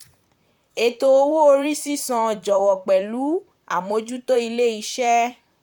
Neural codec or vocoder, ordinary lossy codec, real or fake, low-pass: vocoder, 44.1 kHz, 128 mel bands, Pupu-Vocoder; none; fake; 19.8 kHz